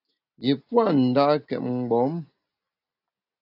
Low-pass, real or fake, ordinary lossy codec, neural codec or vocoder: 5.4 kHz; real; AAC, 48 kbps; none